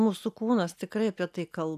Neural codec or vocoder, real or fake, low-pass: none; real; 14.4 kHz